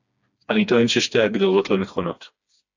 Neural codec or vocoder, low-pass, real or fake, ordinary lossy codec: codec, 16 kHz, 2 kbps, FreqCodec, smaller model; 7.2 kHz; fake; AAC, 48 kbps